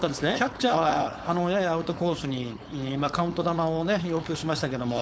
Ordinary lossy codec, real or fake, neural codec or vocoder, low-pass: none; fake; codec, 16 kHz, 4.8 kbps, FACodec; none